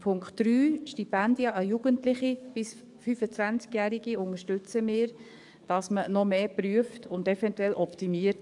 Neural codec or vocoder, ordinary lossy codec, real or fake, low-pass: codec, 44.1 kHz, 7.8 kbps, DAC; AAC, 64 kbps; fake; 10.8 kHz